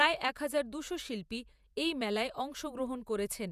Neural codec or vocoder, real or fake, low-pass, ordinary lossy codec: vocoder, 48 kHz, 128 mel bands, Vocos; fake; 14.4 kHz; none